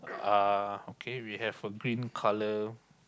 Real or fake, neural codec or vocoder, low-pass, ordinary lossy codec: real; none; none; none